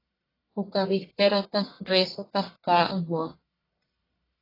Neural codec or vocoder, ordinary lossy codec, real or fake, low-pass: codec, 44.1 kHz, 1.7 kbps, Pupu-Codec; AAC, 24 kbps; fake; 5.4 kHz